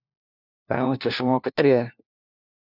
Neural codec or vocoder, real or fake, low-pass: codec, 16 kHz, 1 kbps, FunCodec, trained on LibriTTS, 50 frames a second; fake; 5.4 kHz